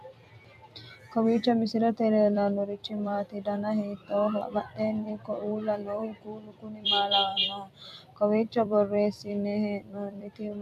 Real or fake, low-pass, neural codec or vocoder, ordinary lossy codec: fake; 14.4 kHz; vocoder, 44.1 kHz, 128 mel bands every 256 samples, BigVGAN v2; MP3, 96 kbps